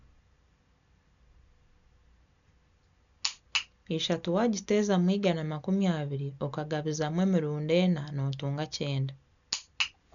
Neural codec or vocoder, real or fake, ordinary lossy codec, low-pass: none; real; none; 7.2 kHz